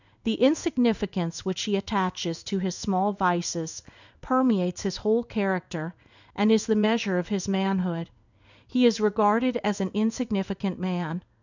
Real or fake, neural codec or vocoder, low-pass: fake; codec, 16 kHz in and 24 kHz out, 1 kbps, XY-Tokenizer; 7.2 kHz